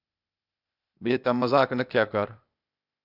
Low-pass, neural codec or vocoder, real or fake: 5.4 kHz; codec, 16 kHz, 0.8 kbps, ZipCodec; fake